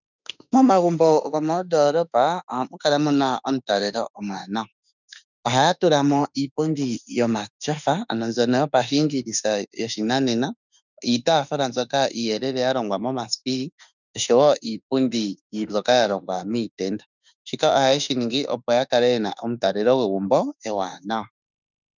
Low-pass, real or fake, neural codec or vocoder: 7.2 kHz; fake; autoencoder, 48 kHz, 32 numbers a frame, DAC-VAE, trained on Japanese speech